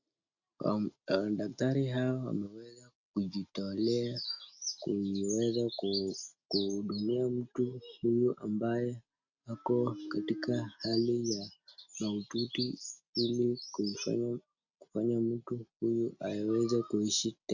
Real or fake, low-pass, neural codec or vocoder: real; 7.2 kHz; none